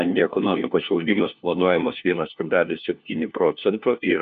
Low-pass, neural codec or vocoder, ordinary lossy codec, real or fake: 7.2 kHz; codec, 16 kHz, 1 kbps, FunCodec, trained on LibriTTS, 50 frames a second; MP3, 96 kbps; fake